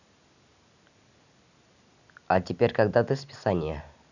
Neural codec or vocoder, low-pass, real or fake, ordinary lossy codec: none; 7.2 kHz; real; none